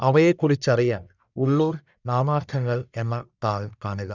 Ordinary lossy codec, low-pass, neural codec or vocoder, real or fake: none; 7.2 kHz; codec, 44.1 kHz, 1.7 kbps, Pupu-Codec; fake